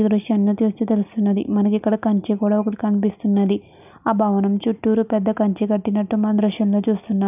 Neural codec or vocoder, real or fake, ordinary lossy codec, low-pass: none; real; none; 3.6 kHz